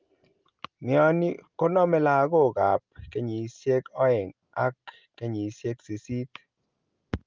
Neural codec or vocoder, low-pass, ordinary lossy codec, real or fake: none; 7.2 kHz; Opus, 24 kbps; real